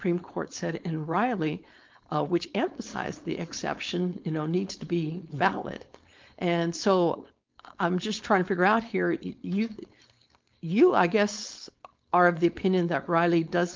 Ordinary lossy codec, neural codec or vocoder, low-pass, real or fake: Opus, 24 kbps; codec, 16 kHz, 4.8 kbps, FACodec; 7.2 kHz; fake